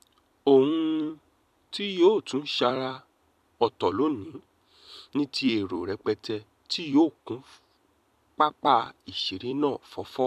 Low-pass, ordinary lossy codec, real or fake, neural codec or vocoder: 14.4 kHz; AAC, 96 kbps; fake; vocoder, 44.1 kHz, 128 mel bands every 256 samples, BigVGAN v2